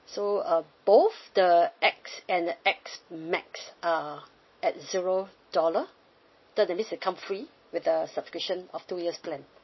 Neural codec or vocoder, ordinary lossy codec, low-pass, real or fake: none; MP3, 24 kbps; 7.2 kHz; real